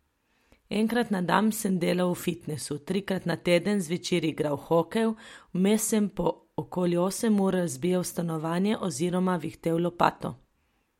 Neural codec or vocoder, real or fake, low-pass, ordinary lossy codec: none; real; 19.8 kHz; MP3, 64 kbps